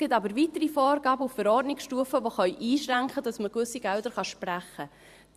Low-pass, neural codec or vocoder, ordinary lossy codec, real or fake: 14.4 kHz; vocoder, 44.1 kHz, 128 mel bands every 512 samples, BigVGAN v2; Opus, 64 kbps; fake